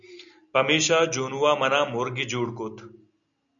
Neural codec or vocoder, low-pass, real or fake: none; 7.2 kHz; real